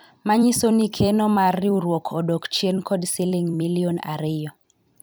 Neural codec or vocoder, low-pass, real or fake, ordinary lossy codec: vocoder, 44.1 kHz, 128 mel bands every 256 samples, BigVGAN v2; none; fake; none